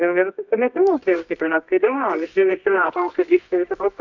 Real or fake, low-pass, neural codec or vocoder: fake; 7.2 kHz; codec, 24 kHz, 0.9 kbps, WavTokenizer, medium music audio release